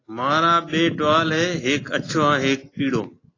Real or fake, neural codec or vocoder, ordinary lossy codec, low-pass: real; none; AAC, 32 kbps; 7.2 kHz